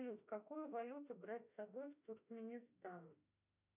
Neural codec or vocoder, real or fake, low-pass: autoencoder, 48 kHz, 32 numbers a frame, DAC-VAE, trained on Japanese speech; fake; 3.6 kHz